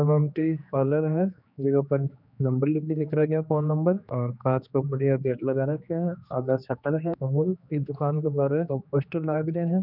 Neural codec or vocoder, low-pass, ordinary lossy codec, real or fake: codec, 16 kHz, 2 kbps, X-Codec, HuBERT features, trained on general audio; 5.4 kHz; none; fake